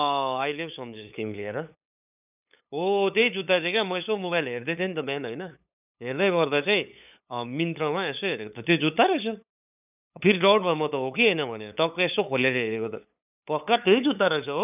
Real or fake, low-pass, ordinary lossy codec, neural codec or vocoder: fake; 3.6 kHz; none; codec, 16 kHz, 8 kbps, FunCodec, trained on LibriTTS, 25 frames a second